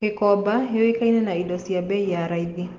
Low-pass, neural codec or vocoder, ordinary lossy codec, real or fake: 7.2 kHz; none; Opus, 16 kbps; real